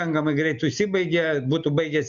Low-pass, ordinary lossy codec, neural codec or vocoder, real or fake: 7.2 kHz; MP3, 96 kbps; none; real